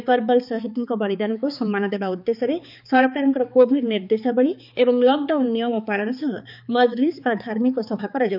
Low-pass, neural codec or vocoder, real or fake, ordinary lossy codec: 5.4 kHz; codec, 16 kHz, 4 kbps, X-Codec, HuBERT features, trained on balanced general audio; fake; none